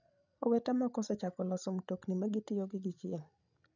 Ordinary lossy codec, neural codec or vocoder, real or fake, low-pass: none; codec, 16 kHz, 16 kbps, FreqCodec, larger model; fake; 7.2 kHz